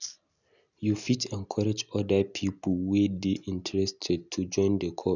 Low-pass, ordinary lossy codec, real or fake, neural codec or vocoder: 7.2 kHz; none; real; none